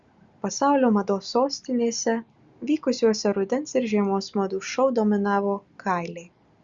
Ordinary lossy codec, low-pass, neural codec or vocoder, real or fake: Opus, 64 kbps; 7.2 kHz; none; real